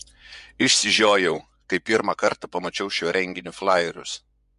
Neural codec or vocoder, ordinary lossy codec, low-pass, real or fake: vocoder, 24 kHz, 100 mel bands, Vocos; MP3, 64 kbps; 10.8 kHz; fake